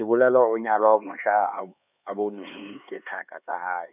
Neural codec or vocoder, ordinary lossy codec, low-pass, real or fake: codec, 16 kHz, 4 kbps, X-Codec, HuBERT features, trained on LibriSpeech; none; 3.6 kHz; fake